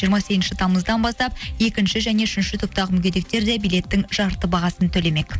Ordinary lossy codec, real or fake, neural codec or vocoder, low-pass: none; real; none; none